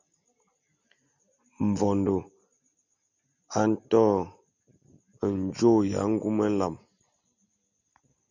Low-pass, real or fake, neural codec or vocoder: 7.2 kHz; real; none